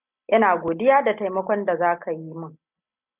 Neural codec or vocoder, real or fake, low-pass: none; real; 3.6 kHz